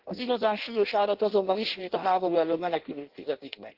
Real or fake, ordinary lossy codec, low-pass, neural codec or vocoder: fake; Opus, 16 kbps; 5.4 kHz; codec, 16 kHz in and 24 kHz out, 0.6 kbps, FireRedTTS-2 codec